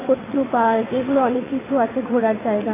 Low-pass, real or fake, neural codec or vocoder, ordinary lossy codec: 3.6 kHz; fake; vocoder, 22.05 kHz, 80 mel bands, WaveNeXt; AAC, 16 kbps